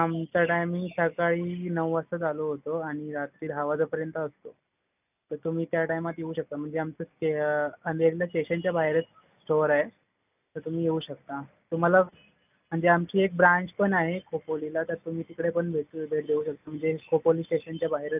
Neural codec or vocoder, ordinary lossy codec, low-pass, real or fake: none; none; 3.6 kHz; real